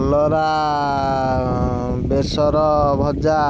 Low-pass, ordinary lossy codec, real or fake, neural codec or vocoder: none; none; real; none